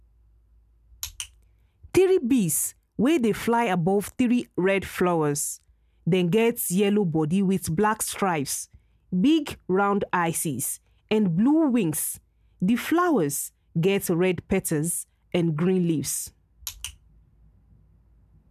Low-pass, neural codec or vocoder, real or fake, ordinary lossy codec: 14.4 kHz; none; real; none